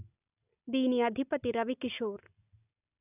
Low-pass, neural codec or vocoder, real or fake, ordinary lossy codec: 3.6 kHz; none; real; none